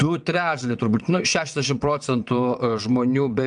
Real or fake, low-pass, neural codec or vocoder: fake; 9.9 kHz; vocoder, 22.05 kHz, 80 mel bands, WaveNeXt